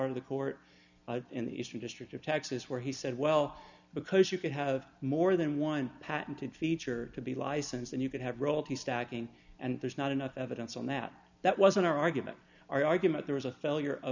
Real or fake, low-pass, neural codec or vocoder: real; 7.2 kHz; none